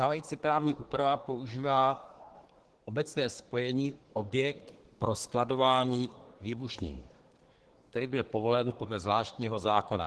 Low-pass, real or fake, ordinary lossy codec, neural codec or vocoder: 10.8 kHz; fake; Opus, 16 kbps; codec, 24 kHz, 1 kbps, SNAC